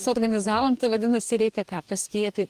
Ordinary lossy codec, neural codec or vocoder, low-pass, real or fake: Opus, 32 kbps; codec, 44.1 kHz, 2.6 kbps, DAC; 14.4 kHz; fake